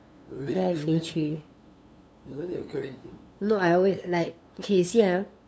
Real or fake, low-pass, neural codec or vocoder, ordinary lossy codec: fake; none; codec, 16 kHz, 2 kbps, FunCodec, trained on LibriTTS, 25 frames a second; none